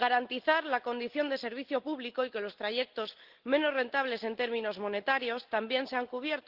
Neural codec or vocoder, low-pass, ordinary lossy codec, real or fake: none; 5.4 kHz; Opus, 16 kbps; real